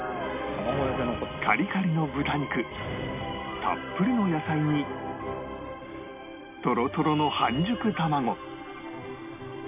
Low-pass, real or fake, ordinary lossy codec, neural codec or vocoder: 3.6 kHz; real; AAC, 32 kbps; none